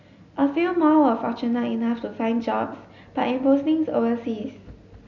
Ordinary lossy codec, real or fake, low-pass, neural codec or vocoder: none; real; 7.2 kHz; none